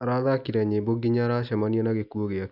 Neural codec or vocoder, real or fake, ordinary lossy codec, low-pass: none; real; none; 5.4 kHz